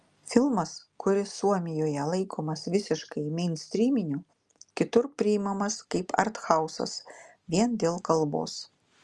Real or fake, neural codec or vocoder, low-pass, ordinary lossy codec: real; none; 10.8 kHz; Opus, 32 kbps